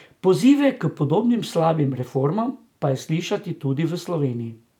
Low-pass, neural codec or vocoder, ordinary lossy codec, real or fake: 19.8 kHz; vocoder, 44.1 kHz, 128 mel bands every 512 samples, BigVGAN v2; none; fake